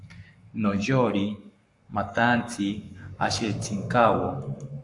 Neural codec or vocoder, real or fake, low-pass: codec, 44.1 kHz, 7.8 kbps, Pupu-Codec; fake; 10.8 kHz